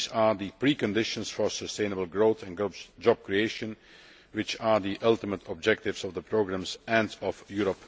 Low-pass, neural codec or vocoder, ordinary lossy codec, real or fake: none; none; none; real